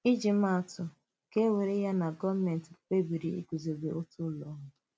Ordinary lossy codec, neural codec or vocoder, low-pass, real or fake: none; none; none; real